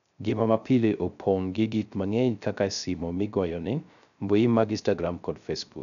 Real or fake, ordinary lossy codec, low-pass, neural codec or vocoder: fake; none; 7.2 kHz; codec, 16 kHz, 0.3 kbps, FocalCodec